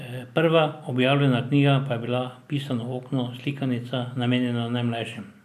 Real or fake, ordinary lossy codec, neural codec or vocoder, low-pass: real; none; none; 14.4 kHz